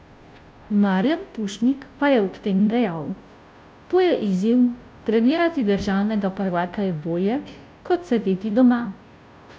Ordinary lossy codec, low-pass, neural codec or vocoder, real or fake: none; none; codec, 16 kHz, 0.5 kbps, FunCodec, trained on Chinese and English, 25 frames a second; fake